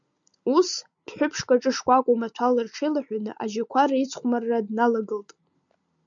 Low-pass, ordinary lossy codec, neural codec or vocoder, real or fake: 7.2 kHz; MP3, 64 kbps; none; real